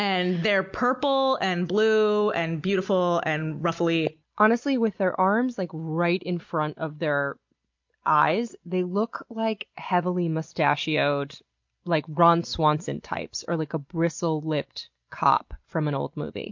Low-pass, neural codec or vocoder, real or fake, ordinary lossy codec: 7.2 kHz; none; real; MP3, 48 kbps